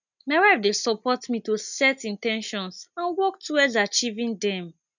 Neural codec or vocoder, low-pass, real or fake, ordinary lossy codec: none; 7.2 kHz; real; none